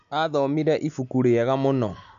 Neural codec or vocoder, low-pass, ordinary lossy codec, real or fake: none; 7.2 kHz; none; real